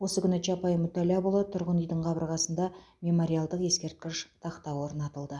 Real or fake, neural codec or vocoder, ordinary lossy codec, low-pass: real; none; none; none